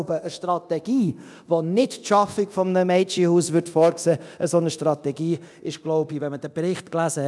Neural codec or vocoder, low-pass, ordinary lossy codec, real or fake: codec, 24 kHz, 0.9 kbps, DualCodec; none; none; fake